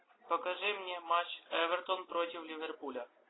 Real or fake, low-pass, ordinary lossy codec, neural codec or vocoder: real; 7.2 kHz; AAC, 16 kbps; none